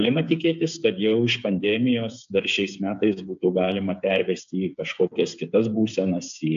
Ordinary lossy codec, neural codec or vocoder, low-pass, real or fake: AAC, 64 kbps; codec, 16 kHz, 8 kbps, FreqCodec, smaller model; 7.2 kHz; fake